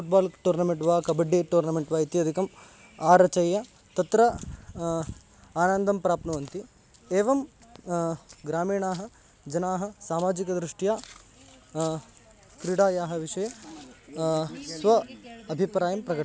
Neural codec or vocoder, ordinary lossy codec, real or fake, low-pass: none; none; real; none